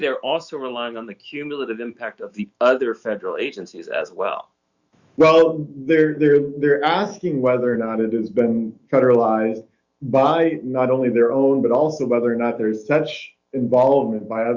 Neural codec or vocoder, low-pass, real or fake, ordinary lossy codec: autoencoder, 48 kHz, 128 numbers a frame, DAC-VAE, trained on Japanese speech; 7.2 kHz; fake; Opus, 64 kbps